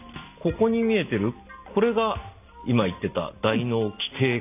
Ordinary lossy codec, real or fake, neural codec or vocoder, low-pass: AAC, 32 kbps; real; none; 3.6 kHz